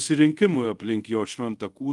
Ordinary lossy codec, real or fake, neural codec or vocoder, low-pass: Opus, 24 kbps; fake; codec, 24 kHz, 0.5 kbps, DualCodec; 10.8 kHz